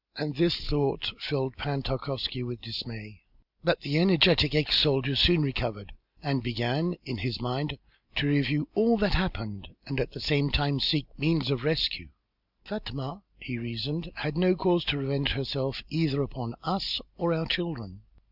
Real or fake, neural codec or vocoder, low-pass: real; none; 5.4 kHz